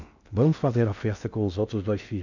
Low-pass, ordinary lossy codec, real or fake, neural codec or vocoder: 7.2 kHz; none; fake; codec, 16 kHz in and 24 kHz out, 0.6 kbps, FocalCodec, streaming, 4096 codes